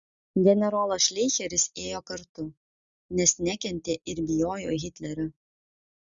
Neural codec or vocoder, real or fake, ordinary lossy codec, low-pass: none; real; Opus, 64 kbps; 7.2 kHz